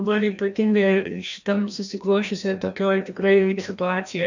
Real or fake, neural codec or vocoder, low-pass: fake; codec, 16 kHz, 1 kbps, FreqCodec, larger model; 7.2 kHz